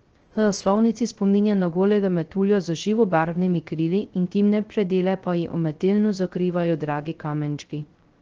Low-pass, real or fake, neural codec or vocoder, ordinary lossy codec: 7.2 kHz; fake; codec, 16 kHz, 0.3 kbps, FocalCodec; Opus, 16 kbps